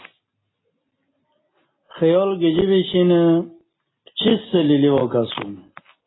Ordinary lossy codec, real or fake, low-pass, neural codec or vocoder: AAC, 16 kbps; real; 7.2 kHz; none